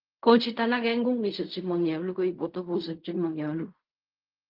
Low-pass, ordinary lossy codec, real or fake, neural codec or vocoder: 5.4 kHz; Opus, 32 kbps; fake; codec, 16 kHz in and 24 kHz out, 0.4 kbps, LongCat-Audio-Codec, fine tuned four codebook decoder